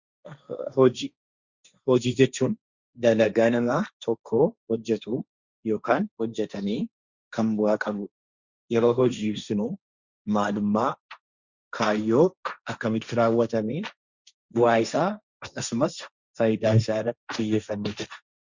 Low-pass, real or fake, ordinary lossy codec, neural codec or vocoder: 7.2 kHz; fake; Opus, 64 kbps; codec, 16 kHz, 1.1 kbps, Voila-Tokenizer